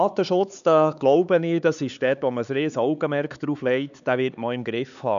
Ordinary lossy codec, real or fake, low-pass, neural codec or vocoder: none; fake; 7.2 kHz; codec, 16 kHz, 4 kbps, X-Codec, HuBERT features, trained on LibriSpeech